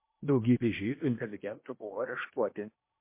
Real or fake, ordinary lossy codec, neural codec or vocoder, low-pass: fake; MP3, 24 kbps; codec, 16 kHz in and 24 kHz out, 0.8 kbps, FocalCodec, streaming, 65536 codes; 3.6 kHz